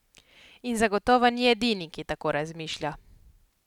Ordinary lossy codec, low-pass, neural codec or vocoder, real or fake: none; 19.8 kHz; none; real